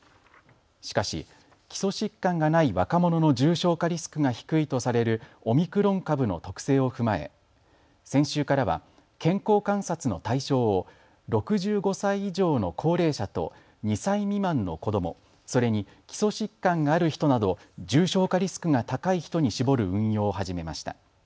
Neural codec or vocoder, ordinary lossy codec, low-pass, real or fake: none; none; none; real